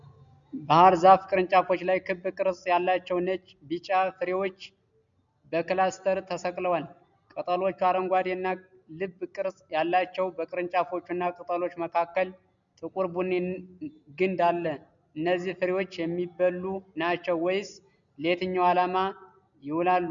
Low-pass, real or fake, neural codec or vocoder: 7.2 kHz; real; none